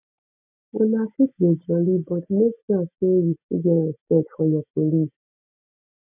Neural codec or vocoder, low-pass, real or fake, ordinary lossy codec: none; 3.6 kHz; real; none